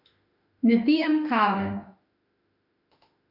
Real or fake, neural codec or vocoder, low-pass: fake; autoencoder, 48 kHz, 32 numbers a frame, DAC-VAE, trained on Japanese speech; 5.4 kHz